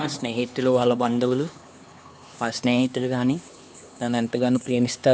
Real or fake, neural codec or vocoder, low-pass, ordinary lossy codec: fake; codec, 16 kHz, 2 kbps, X-Codec, HuBERT features, trained on LibriSpeech; none; none